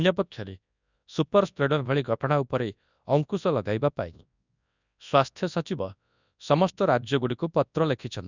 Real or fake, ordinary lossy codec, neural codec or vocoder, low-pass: fake; none; codec, 24 kHz, 0.9 kbps, WavTokenizer, large speech release; 7.2 kHz